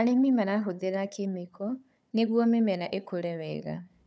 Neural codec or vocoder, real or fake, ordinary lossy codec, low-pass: codec, 16 kHz, 4 kbps, FunCodec, trained on Chinese and English, 50 frames a second; fake; none; none